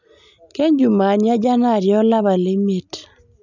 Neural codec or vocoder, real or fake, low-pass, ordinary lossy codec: none; real; 7.2 kHz; none